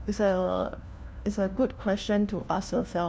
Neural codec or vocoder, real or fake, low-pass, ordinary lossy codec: codec, 16 kHz, 1 kbps, FunCodec, trained on LibriTTS, 50 frames a second; fake; none; none